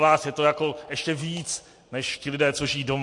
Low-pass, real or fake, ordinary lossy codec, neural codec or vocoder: 10.8 kHz; real; MP3, 48 kbps; none